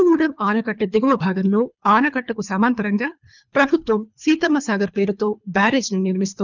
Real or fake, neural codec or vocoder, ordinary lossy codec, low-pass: fake; codec, 24 kHz, 3 kbps, HILCodec; none; 7.2 kHz